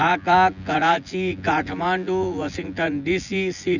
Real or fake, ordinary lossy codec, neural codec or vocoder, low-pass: fake; none; vocoder, 24 kHz, 100 mel bands, Vocos; 7.2 kHz